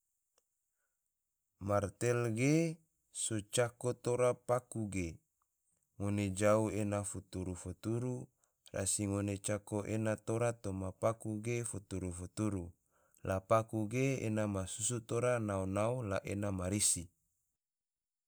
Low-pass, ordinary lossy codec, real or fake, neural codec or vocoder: none; none; real; none